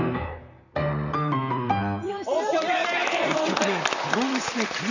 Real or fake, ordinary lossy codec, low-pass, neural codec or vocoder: fake; none; 7.2 kHz; vocoder, 44.1 kHz, 128 mel bands, Pupu-Vocoder